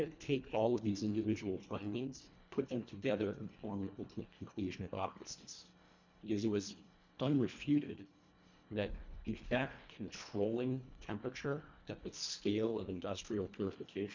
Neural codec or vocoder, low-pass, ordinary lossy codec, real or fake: codec, 24 kHz, 1.5 kbps, HILCodec; 7.2 kHz; MP3, 64 kbps; fake